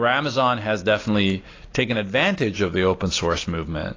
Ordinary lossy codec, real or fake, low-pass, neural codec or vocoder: AAC, 32 kbps; real; 7.2 kHz; none